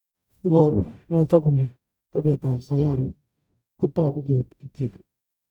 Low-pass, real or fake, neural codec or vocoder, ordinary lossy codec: 19.8 kHz; fake; codec, 44.1 kHz, 0.9 kbps, DAC; none